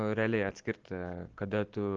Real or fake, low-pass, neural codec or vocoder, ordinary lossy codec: real; 7.2 kHz; none; Opus, 16 kbps